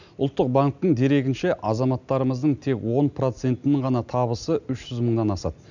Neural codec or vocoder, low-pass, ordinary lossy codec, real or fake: none; 7.2 kHz; none; real